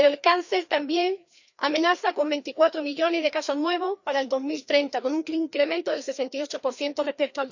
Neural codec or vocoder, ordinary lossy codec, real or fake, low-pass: codec, 16 kHz, 2 kbps, FreqCodec, larger model; none; fake; 7.2 kHz